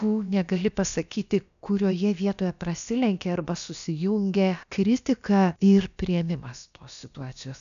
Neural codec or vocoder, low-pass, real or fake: codec, 16 kHz, about 1 kbps, DyCAST, with the encoder's durations; 7.2 kHz; fake